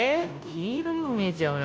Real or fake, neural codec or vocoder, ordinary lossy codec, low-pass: fake; codec, 16 kHz, 0.5 kbps, FunCodec, trained on Chinese and English, 25 frames a second; none; none